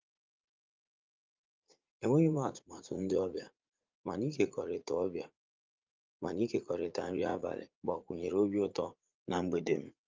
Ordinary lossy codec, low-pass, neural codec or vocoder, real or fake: Opus, 24 kbps; 7.2 kHz; vocoder, 44.1 kHz, 80 mel bands, Vocos; fake